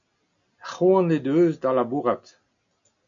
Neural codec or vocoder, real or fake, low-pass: none; real; 7.2 kHz